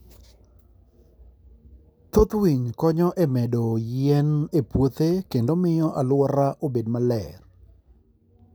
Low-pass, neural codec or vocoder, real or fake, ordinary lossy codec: none; none; real; none